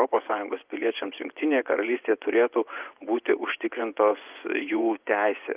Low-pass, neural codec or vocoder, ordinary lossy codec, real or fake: 3.6 kHz; vocoder, 24 kHz, 100 mel bands, Vocos; Opus, 32 kbps; fake